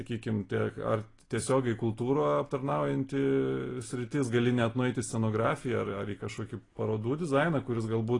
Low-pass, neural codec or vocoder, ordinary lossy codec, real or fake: 10.8 kHz; none; AAC, 32 kbps; real